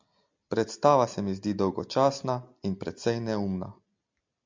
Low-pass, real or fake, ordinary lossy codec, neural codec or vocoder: 7.2 kHz; real; MP3, 64 kbps; none